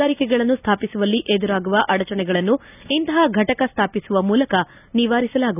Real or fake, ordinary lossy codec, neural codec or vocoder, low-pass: real; none; none; 3.6 kHz